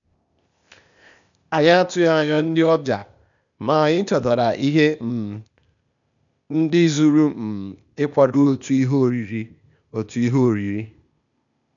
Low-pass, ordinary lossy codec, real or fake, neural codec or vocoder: 7.2 kHz; none; fake; codec, 16 kHz, 0.8 kbps, ZipCodec